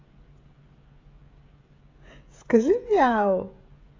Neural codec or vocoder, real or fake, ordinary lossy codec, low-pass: codec, 16 kHz, 16 kbps, FreqCodec, smaller model; fake; AAC, 32 kbps; 7.2 kHz